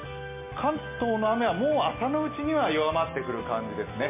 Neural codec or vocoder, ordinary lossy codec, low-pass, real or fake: none; MP3, 24 kbps; 3.6 kHz; real